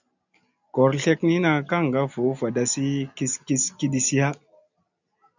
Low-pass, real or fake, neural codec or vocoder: 7.2 kHz; real; none